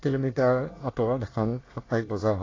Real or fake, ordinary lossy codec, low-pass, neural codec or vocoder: fake; AAC, 32 kbps; 7.2 kHz; codec, 24 kHz, 1 kbps, SNAC